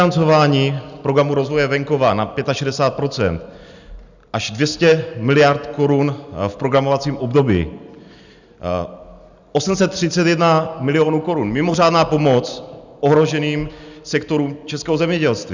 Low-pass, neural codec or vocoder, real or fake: 7.2 kHz; none; real